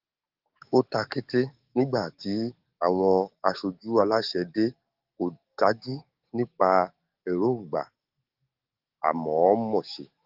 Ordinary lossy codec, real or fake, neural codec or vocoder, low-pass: Opus, 32 kbps; fake; codec, 44.1 kHz, 7.8 kbps, DAC; 5.4 kHz